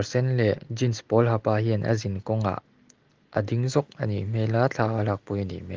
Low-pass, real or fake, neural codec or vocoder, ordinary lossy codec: 7.2 kHz; real; none; Opus, 16 kbps